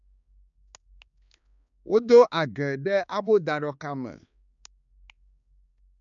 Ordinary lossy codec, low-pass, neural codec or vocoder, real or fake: none; 7.2 kHz; codec, 16 kHz, 2 kbps, X-Codec, HuBERT features, trained on balanced general audio; fake